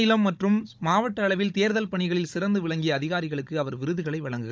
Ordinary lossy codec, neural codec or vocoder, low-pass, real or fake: none; codec, 16 kHz, 16 kbps, FunCodec, trained on Chinese and English, 50 frames a second; none; fake